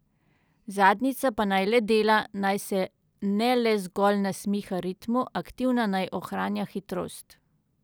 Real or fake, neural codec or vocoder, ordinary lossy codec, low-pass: real; none; none; none